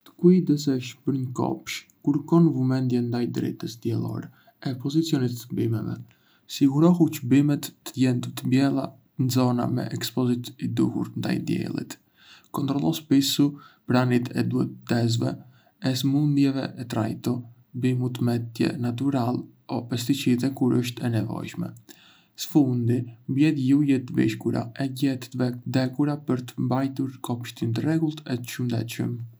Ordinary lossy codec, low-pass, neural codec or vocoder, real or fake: none; none; none; real